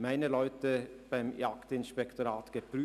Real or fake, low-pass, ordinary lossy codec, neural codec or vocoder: real; 14.4 kHz; none; none